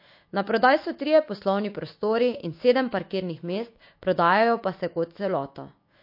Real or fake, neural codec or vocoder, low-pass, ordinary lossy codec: fake; autoencoder, 48 kHz, 128 numbers a frame, DAC-VAE, trained on Japanese speech; 5.4 kHz; MP3, 32 kbps